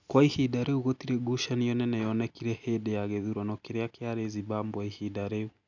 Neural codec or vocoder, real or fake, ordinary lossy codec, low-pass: none; real; none; 7.2 kHz